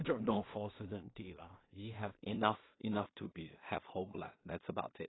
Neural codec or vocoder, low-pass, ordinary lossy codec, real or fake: codec, 16 kHz in and 24 kHz out, 0.4 kbps, LongCat-Audio-Codec, two codebook decoder; 7.2 kHz; AAC, 16 kbps; fake